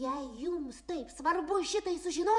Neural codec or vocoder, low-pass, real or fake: vocoder, 48 kHz, 128 mel bands, Vocos; 10.8 kHz; fake